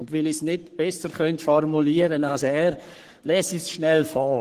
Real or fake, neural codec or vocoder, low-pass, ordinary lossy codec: fake; codec, 44.1 kHz, 3.4 kbps, Pupu-Codec; 14.4 kHz; Opus, 16 kbps